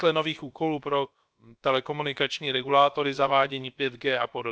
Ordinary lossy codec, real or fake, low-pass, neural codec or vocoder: none; fake; none; codec, 16 kHz, about 1 kbps, DyCAST, with the encoder's durations